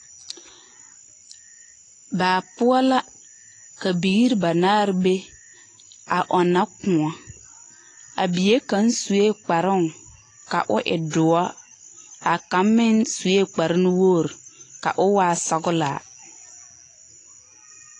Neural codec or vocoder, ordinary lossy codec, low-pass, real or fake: none; AAC, 32 kbps; 10.8 kHz; real